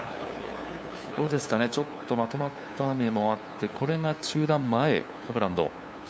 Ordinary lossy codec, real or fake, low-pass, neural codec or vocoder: none; fake; none; codec, 16 kHz, 2 kbps, FunCodec, trained on LibriTTS, 25 frames a second